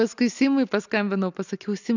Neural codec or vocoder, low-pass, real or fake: none; 7.2 kHz; real